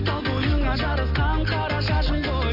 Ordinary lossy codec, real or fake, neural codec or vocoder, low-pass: MP3, 48 kbps; real; none; 5.4 kHz